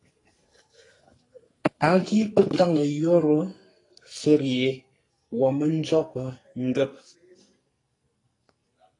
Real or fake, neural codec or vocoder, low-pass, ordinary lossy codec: fake; codec, 44.1 kHz, 2.6 kbps, SNAC; 10.8 kHz; AAC, 32 kbps